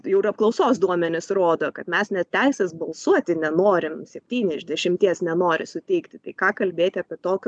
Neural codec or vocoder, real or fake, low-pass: none; real; 9.9 kHz